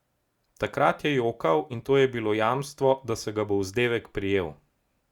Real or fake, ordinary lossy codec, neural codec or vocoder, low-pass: real; Opus, 64 kbps; none; 19.8 kHz